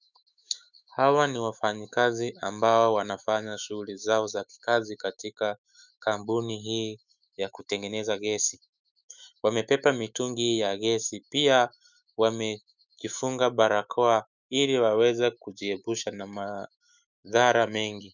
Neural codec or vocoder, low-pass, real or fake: autoencoder, 48 kHz, 128 numbers a frame, DAC-VAE, trained on Japanese speech; 7.2 kHz; fake